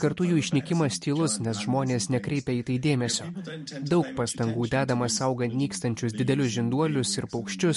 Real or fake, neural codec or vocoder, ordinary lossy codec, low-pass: real; none; MP3, 48 kbps; 14.4 kHz